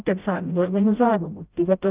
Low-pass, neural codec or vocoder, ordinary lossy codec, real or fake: 3.6 kHz; codec, 16 kHz, 0.5 kbps, FreqCodec, smaller model; Opus, 32 kbps; fake